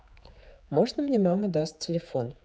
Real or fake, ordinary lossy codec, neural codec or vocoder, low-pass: fake; none; codec, 16 kHz, 4 kbps, X-Codec, HuBERT features, trained on general audio; none